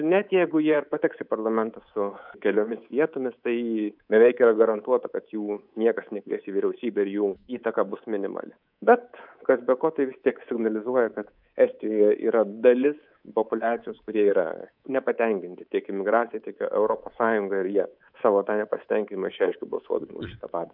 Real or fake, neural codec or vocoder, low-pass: fake; codec, 24 kHz, 3.1 kbps, DualCodec; 5.4 kHz